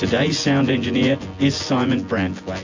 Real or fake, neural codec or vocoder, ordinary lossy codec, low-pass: fake; vocoder, 24 kHz, 100 mel bands, Vocos; AAC, 48 kbps; 7.2 kHz